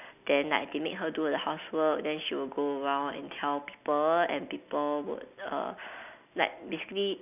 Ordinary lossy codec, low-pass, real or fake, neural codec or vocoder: none; 3.6 kHz; real; none